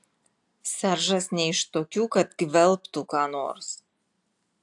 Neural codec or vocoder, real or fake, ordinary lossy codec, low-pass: none; real; AAC, 64 kbps; 10.8 kHz